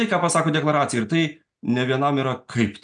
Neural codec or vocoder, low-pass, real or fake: none; 9.9 kHz; real